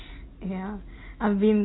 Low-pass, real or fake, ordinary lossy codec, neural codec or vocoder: 7.2 kHz; real; AAC, 16 kbps; none